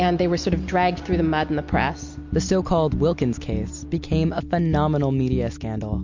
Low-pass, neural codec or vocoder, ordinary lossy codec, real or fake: 7.2 kHz; none; MP3, 48 kbps; real